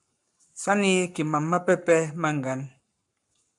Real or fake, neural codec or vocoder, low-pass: fake; codec, 44.1 kHz, 7.8 kbps, Pupu-Codec; 10.8 kHz